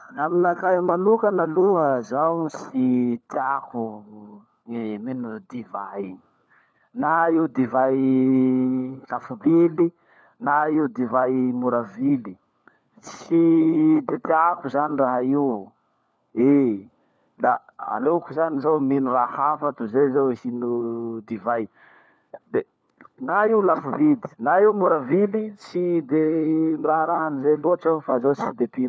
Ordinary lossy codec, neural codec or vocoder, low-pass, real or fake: none; codec, 16 kHz, 4 kbps, FunCodec, trained on LibriTTS, 50 frames a second; none; fake